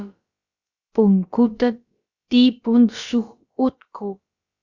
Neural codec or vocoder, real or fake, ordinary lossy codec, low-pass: codec, 16 kHz, about 1 kbps, DyCAST, with the encoder's durations; fake; Opus, 64 kbps; 7.2 kHz